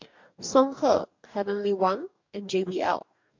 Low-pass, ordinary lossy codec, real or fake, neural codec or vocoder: 7.2 kHz; MP3, 48 kbps; fake; codec, 44.1 kHz, 2.6 kbps, DAC